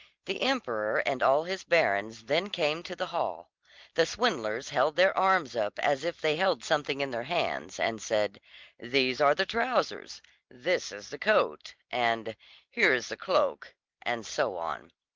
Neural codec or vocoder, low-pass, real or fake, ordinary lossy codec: none; 7.2 kHz; real; Opus, 32 kbps